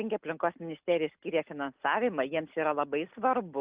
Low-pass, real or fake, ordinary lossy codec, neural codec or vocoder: 3.6 kHz; real; Opus, 32 kbps; none